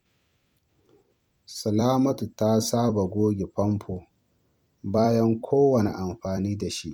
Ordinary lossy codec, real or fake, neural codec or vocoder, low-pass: MP3, 96 kbps; fake; vocoder, 44.1 kHz, 128 mel bands every 256 samples, BigVGAN v2; 19.8 kHz